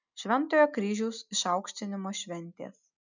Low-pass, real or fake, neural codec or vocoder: 7.2 kHz; real; none